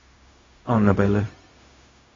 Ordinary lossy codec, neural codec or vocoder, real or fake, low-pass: AAC, 32 kbps; codec, 16 kHz, 0.4 kbps, LongCat-Audio-Codec; fake; 7.2 kHz